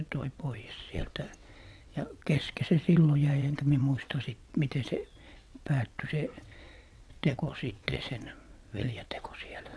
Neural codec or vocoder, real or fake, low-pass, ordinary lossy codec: vocoder, 22.05 kHz, 80 mel bands, WaveNeXt; fake; none; none